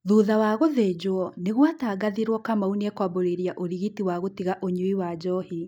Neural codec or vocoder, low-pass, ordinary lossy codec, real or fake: none; 19.8 kHz; none; real